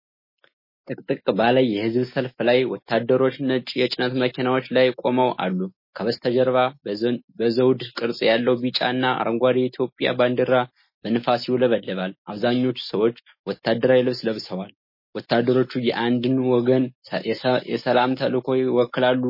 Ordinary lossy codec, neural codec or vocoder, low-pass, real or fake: MP3, 24 kbps; none; 5.4 kHz; real